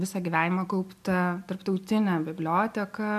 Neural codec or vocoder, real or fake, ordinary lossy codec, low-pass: none; real; MP3, 96 kbps; 14.4 kHz